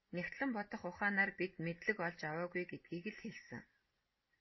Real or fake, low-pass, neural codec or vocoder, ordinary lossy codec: real; 7.2 kHz; none; MP3, 24 kbps